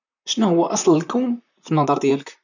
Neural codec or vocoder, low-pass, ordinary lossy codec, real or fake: vocoder, 44.1 kHz, 128 mel bands, Pupu-Vocoder; 7.2 kHz; none; fake